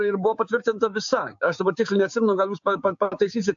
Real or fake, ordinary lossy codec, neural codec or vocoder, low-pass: fake; AAC, 64 kbps; codec, 16 kHz, 6 kbps, DAC; 7.2 kHz